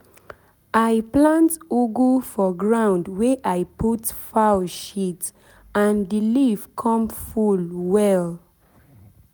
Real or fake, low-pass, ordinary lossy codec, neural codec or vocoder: real; none; none; none